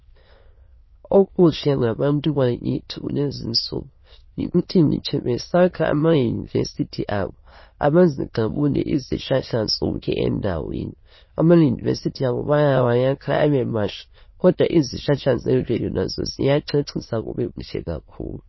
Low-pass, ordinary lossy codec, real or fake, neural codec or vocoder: 7.2 kHz; MP3, 24 kbps; fake; autoencoder, 22.05 kHz, a latent of 192 numbers a frame, VITS, trained on many speakers